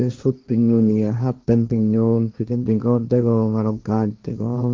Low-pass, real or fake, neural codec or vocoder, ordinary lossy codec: 7.2 kHz; fake; codec, 16 kHz, 1.1 kbps, Voila-Tokenizer; Opus, 32 kbps